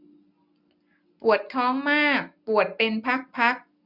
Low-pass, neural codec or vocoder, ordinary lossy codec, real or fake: 5.4 kHz; none; none; real